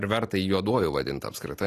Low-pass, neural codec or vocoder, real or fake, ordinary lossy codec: 14.4 kHz; none; real; AAC, 48 kbps